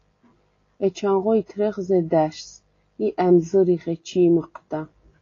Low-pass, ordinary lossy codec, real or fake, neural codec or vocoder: 7.2 kHz; AAC, 32 kbps; real; none